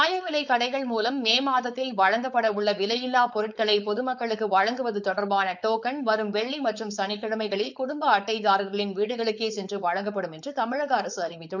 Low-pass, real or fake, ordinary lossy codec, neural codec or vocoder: 7.2 kHz; fake; none; codec, 16 kHz, 4.8 kbps, FACodec